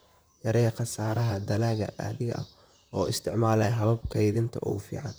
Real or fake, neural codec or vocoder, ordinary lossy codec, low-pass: fake; vocoder, 44.1 kHz, 128 mel bands, Pupu-Vocoder; none; none